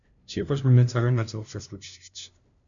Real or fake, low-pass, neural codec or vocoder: fake; 7.2 kHz; codec, 16 kHz, 0.5 kbps, FunCodec, trained on LibriTTS, 25 frames a second